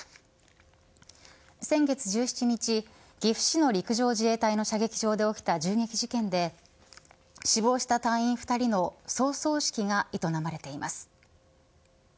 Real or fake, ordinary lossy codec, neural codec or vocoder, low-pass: real; none; none; none